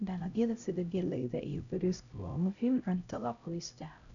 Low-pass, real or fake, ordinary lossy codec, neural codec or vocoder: 7.2 kHz; fake; none; codec, 16 kHz, 0.5 kbps, X-Codec, HuBERT features, trained on LibriSpeech